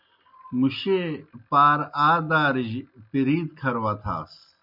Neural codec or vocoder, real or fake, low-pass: none; real; 5.4 kHz